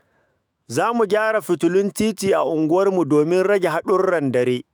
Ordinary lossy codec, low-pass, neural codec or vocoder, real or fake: none; none; autoencoder, 48 kHz, 128 numbers a frame, DAC-VAE, trained on Japanese speech; fake